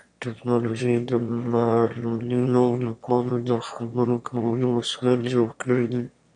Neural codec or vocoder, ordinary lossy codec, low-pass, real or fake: autoencoder, 22.05 kHz, a latent of 192 numbers a frame, VITS, trained on one speaker; MP3, 96 kbps; 9.9 kHz; fake